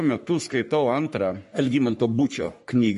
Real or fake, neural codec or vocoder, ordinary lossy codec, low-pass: fake; codec, 44.1 kHz, 3.4 kbps, Pupu-Codec; MP3, 48 kbps; 14.4 kHz